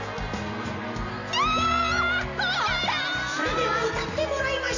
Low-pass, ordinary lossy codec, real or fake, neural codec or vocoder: 7.2 kHz; none; real; none